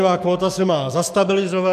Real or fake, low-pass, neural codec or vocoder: fake; 14.4 kHz; codec, 44.1 kHz, 7.8 kbps, DAC